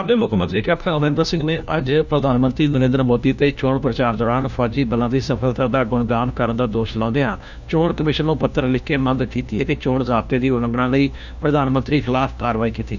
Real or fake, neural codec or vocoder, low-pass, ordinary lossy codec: fake; codec, 16 kHz, 1 kbps, FunCodec, trained on LibriTTS, 50 frames a second; 7.2 kHz; none